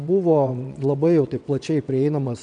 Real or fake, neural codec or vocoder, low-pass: fake; vocoder, 22.05 kHz, 80 mel bands, WaveNeXt; 9.9 kHz